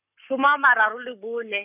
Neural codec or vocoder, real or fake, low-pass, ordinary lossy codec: codec, 44.1 kHz, 7.8 kbps, Pupu-Codec; fake; 3.6 kHz; none